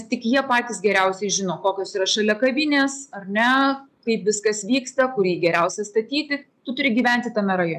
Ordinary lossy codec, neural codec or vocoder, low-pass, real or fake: MP3, 96 kbps; none; 14.4 kHz; real